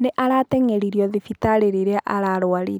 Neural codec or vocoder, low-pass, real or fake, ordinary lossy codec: none; none; real; none